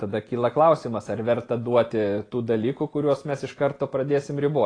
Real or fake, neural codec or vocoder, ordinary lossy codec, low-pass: real; none; AAC, 32 kbps; 9.9 kHz